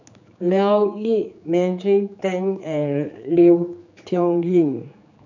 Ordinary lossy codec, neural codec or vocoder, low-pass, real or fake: none; codec, 16 kHz, 4 kbps, X-Codec, HuBERT features, trained on general audio; 7.2 kHz; fake